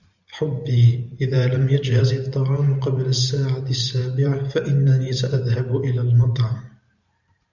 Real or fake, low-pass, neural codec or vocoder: fake; 7.2 kHz; vocoder, 44.1 kHz, 128 mel bands every 512 samples, BigVGAN v2